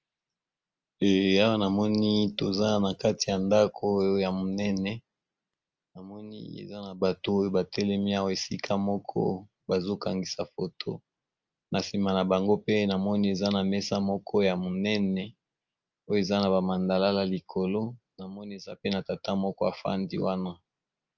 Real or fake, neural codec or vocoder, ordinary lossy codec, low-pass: real; none; Opus, 24 kbps; 7.2 kHz